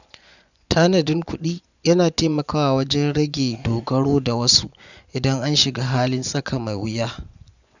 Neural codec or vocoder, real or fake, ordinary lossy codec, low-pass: vocoder, 24 kHz, 100 mel bands, Vocos; fake; none; 7.2 kHz